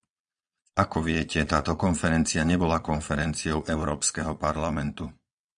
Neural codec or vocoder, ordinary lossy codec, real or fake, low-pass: vocoder, 22.05 kHz, 80 mel bands, Vocos; MP3, 96 kbps; fake; 9.9 kHz